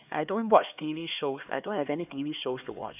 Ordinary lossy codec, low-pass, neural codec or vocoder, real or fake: none; 3.6 kHz; codec, 16 kHz, 2 kbps, X-Codec, HuBERT features, trained on LibriSpeech; fake